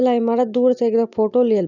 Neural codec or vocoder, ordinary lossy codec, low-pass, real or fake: none; none; 7.2 kHz; real